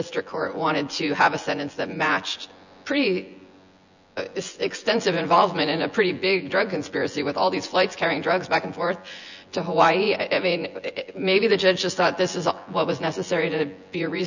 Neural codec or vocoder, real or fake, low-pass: vocoder, 24 kHz, 100 mel bands, Vocos; fake; 7.2 kHz